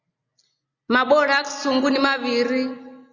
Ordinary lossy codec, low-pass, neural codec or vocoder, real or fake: Opus, 64 kbps; 7.2 kHz; none; real